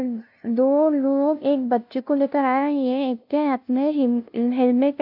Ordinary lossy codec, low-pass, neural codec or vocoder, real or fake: AAC, 48 kbps; 5.4 kHz; codec, 16 kHz, 0.5 kbps, FunCodec, trained on LibriTTS, 25 frames a second; fake